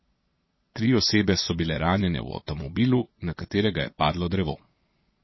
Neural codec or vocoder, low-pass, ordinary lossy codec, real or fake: vocoder, 22.05 kHz, 80 mel bands, Vocos; 7.2 kHz; MP3, 24 kbps; fake